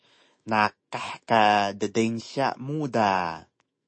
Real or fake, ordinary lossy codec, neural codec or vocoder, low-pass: fake; MP3, 32 kbps; autoencoder, 48 kHz, 128 numbers a frame, DAC-VAE, trained on Japanese speech; 9.9 kHz